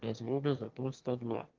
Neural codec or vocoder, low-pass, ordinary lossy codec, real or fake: autoencoder, 22.05 kHz, a latent of 192 numbers a frame, VITS, trained on one speaker; 7.2 kHz; Opus, 32 kbps; fake